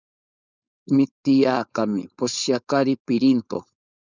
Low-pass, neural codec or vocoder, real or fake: 7.2 kHz; codec, 16 kHz, 4.8 kbps, FACodec; fake